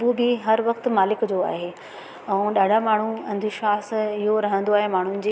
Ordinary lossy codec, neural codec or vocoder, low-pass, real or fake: none; none; none; real